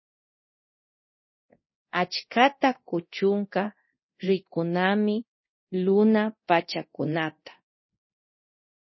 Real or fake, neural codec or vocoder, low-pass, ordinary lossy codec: fake; codec, 24 kHz, 0.9 kbps, DualCodec; 7.2 kHz; MP3, 24 kbps